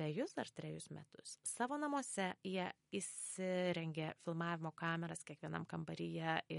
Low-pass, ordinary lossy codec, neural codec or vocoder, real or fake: 10.8 kHz; MP3, 48 kbps; none; real